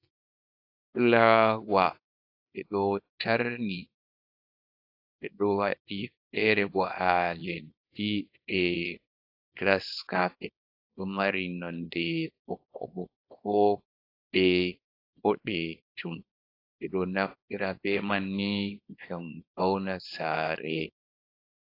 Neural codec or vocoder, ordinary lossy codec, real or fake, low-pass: codec, 24 kHz, 0.9 kbps, WavTokenizer, small release; AAC, 32 kbps; fake; 5.4 kHz